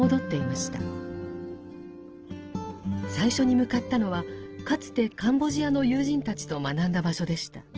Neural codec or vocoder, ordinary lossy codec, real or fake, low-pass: none; Opus, 16 kbps; real; 7.2 kHz